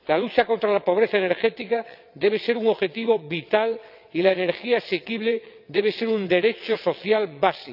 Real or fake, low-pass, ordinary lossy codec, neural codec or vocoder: fake; 5.4 kHz; none; vocoder, 22.05 kHz, 80 mel bands, WaveNeXt